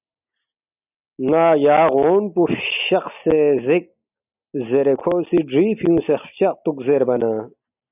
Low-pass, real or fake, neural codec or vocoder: 3.6 kHz; real; none